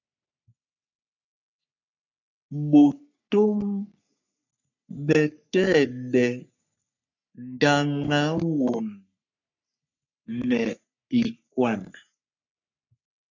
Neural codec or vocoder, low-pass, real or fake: codec, 44.1 kHz, 3.4 kbps, Pupu-Codec; 7.2 kHz; fake